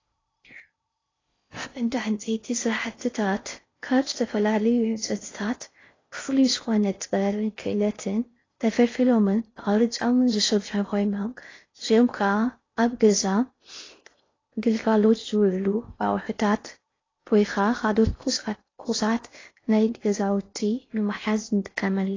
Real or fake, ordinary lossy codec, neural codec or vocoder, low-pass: fake; AAC, 32 kbps; codec, 16 kHz in and 24 kHz out, 0.6 kbps, FocalCodec, streaming, 4096 codes; 7.2 kHz